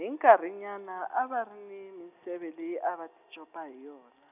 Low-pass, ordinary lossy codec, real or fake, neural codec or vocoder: 3.6 kHz; none; real; none